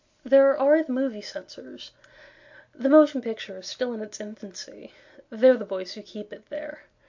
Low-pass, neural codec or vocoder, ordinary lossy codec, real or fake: 7.2 kHz; none; MP3, 48 kbps; real